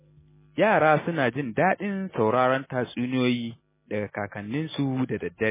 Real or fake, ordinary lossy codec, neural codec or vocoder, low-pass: real; MP3, 16 kbps; none; 3.6 kHz